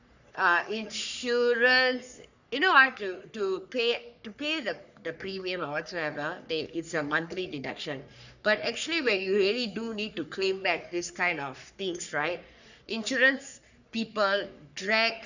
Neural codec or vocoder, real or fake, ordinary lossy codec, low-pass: codec, 44.1 kHz, 3.4 kbps, Pupu-Codec; fake; none; 7.2 kHz